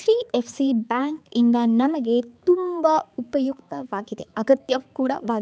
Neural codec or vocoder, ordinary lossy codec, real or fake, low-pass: codec, 16 kHz, 4 kbps, X-Codec, HuBERT features, trained on balanced general audio; none; fake; none